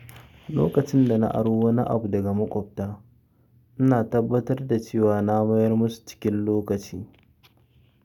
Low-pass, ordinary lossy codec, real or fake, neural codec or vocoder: 19.8 kHz; none; real; none